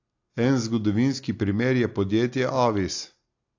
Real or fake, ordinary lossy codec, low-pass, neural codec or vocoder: real; AAC, 48 kbps; 7.2 kHz; none